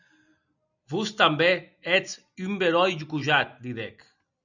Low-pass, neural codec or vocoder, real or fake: 7.2 kHz; none; real